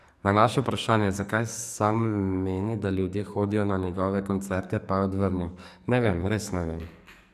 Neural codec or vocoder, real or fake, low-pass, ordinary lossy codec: codec, 44.1 kHz, 2.6 kbps, SNAC; fake; 14.4 kHz; none